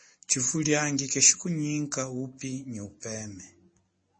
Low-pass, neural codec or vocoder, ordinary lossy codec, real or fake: 9.9 kHz; none; MP3, 32 kbps; real